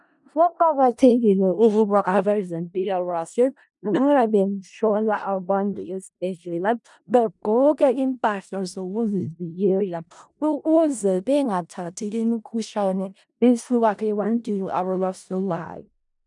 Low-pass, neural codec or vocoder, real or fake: 10.8 kHz; codec, 16 kHz in and 24 kHz out, 0.4 kbps, LongCat-Audio-Codec, four codebook decoder; fake